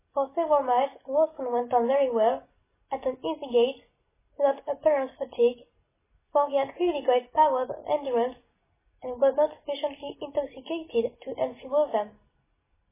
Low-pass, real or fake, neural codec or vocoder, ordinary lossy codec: 3.6 kHz; real; none; MP3, 16 kbps